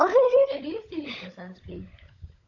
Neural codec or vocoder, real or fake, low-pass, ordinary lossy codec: codec, 16 kHz, 16 kbps, FunCodec, trained on LibriTTS, 50 frames a second; fake; 7.2 kHz; none